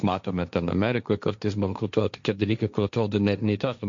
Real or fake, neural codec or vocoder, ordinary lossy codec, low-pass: fake; codec, 16 kHz, 1.1 kbps, Voila-Tokenizer; MP3, 64 kbps; 7.2 kHz